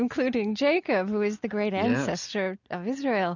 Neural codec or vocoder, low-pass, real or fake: none; 7.2 kHz; real